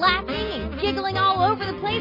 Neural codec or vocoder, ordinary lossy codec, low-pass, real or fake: none; MP3, 24 kbps; 5.4 kHz; real